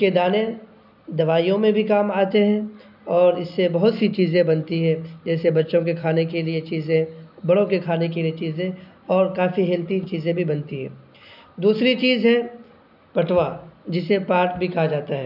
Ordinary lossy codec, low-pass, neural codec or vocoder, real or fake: none; 5.4 kHz; none; real